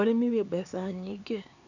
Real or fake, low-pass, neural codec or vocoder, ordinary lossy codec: fake; 7.2 kHz; codec, 16 kHz, 4 kbps, X-Codec, HuBERT features, trained on LibriSpeech; none